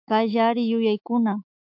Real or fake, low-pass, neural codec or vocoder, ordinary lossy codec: fake; 5.4 kHz; autoencoder, 48 kHz, 128 numbers a frame, DAC-VAE, trained on Japanese speech; MP3, 48 kbps